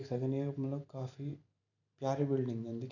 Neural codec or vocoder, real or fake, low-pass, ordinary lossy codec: vocoder, 44.1 kHz, 128 mel bands every 512 samples, BigVGAN v2; fake; 7.2 kHz; none